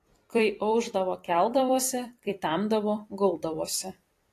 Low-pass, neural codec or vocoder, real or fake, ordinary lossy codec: 14.4 kHz; vocoder, 44.1 kHz, 128 mel bands every 256 samples, BigVGAN v2; fake; AAC, 48 kbps